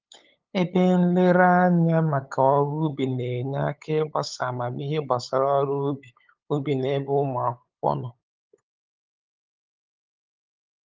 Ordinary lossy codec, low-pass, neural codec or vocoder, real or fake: Opus, 32 kbps; 7.2 kHz; codec, 16 kHz, 8 kbps, FunCodec, trained on LibriTTS, 25 frames a second; fake